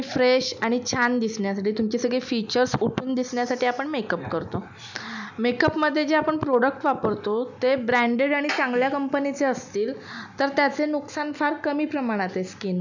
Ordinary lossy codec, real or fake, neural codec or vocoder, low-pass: none; real; none; 7.2 kHz